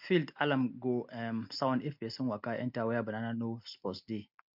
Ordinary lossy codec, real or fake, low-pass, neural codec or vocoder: MP3, 48 kbps; real; 5.4 kHz; none